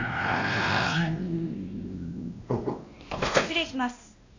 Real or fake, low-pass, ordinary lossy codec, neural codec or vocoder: fake; 7.2 kHz; AAC, 48 kbps; codec, 16 kHz, 1 kbps, X-Codec, WavLM features, trained on Multilingual LibriSpeech